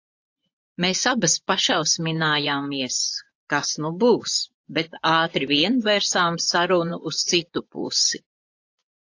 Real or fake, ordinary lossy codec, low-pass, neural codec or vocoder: fake; AAC, 48 kbps; 7.2 kHz; vocoder, 24 kHz, 100 mel bands, Vocos